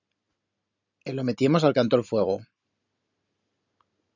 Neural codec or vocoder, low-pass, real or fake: none; 7.2 kHz; real